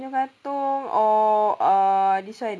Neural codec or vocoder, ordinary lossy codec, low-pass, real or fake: none; none; none; real